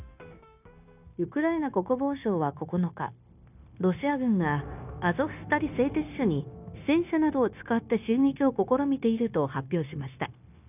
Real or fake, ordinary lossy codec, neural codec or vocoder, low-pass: fake; none; codec, 16 kHz, 0.9 kbps, LongCat-Audio-Codec; 3.6 kHz